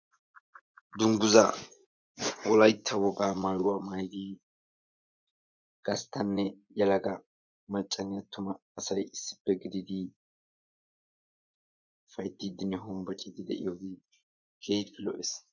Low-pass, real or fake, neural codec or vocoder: 7.2 kHz; fake; vocoder, 24 kHz, 100 mel bands, Vocos